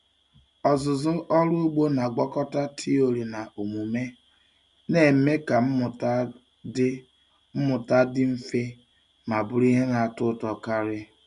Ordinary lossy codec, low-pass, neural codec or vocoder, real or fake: none; 10.8 kHz; none; real